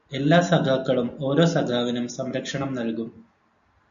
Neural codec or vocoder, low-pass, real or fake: none; 7.2 kHz; real